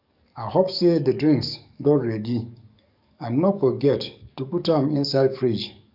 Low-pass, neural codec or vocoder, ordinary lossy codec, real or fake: 5.4 kHz; codec, 44.1 kHz, 7.8 kbps, DAC; none; fake